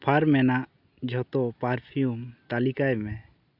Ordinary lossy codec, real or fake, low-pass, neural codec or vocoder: none; real; 5.4 kHz; none